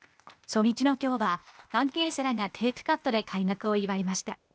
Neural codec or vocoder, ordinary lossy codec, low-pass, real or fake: codec, 16 kHz, 0.8 kbps, ZipCodec; none; none; fake